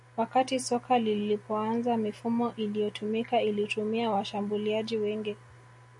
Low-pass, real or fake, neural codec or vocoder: 10.8 kHz; real; none